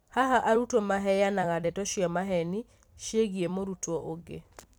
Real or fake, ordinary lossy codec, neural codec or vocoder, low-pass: fake; none; vocoder, 44.1 kHz, 128 mel bands every 256 samples, BigVGAN v2; none